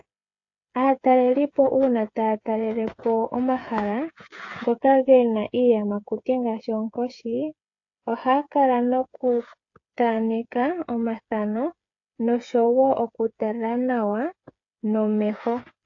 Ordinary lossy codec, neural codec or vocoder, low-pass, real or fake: AAC, 48 kbps; codec, 16 kHz, 8 kbps, FreqCodec, smaller model; 7.2 kHz; fake